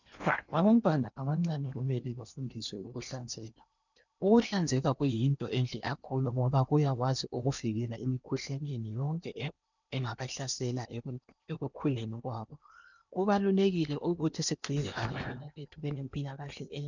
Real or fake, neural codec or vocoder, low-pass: fake; codec, 16 kHz in and 24 kHz out, 0.8 kbps, FocalCodec, streaming, 65536 codes; 7.2 kHz